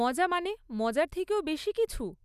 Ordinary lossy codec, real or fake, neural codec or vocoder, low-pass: none; real; none; 14.4 kHz